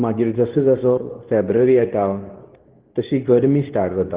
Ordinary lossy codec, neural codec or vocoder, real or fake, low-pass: Opus, 16 kbps; codec, 24 kHz, 0.9 kbps, WavTokenizer, medium speech release version 2; fake; 3.6 kHz